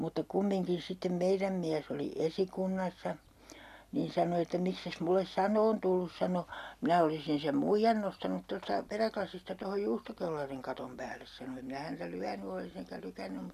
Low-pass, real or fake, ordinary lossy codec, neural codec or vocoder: 14.4 kHz; real; none; none